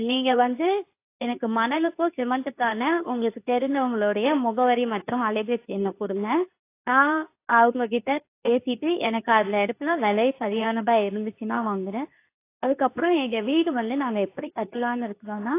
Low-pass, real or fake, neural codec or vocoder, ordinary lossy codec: 3.6 kHz; fake; codec, 24 kHz, 0.9 kbps, WavTokenizer, medium speech release version 2; AAC, 24 kbps